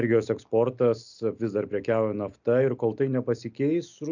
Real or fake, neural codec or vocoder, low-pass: real; none; 7.2 kHz